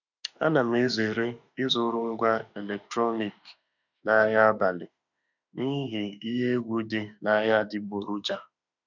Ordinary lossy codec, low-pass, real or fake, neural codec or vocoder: none; 7.2 kHz; fake; autoencoder, 48 kHz, 32 numbers a frame, DAC-VAE, trained on Japanese speech